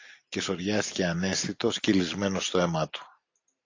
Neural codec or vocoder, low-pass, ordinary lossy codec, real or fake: none; 7.2 kHz; AAC, 48 kbps; real